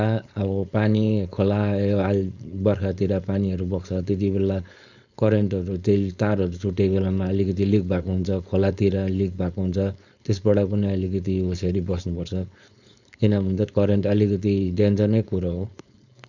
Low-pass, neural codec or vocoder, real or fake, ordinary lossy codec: 7.2 kHz; codec, 16 kHz, 4.8 kbps, FACodec; fake; none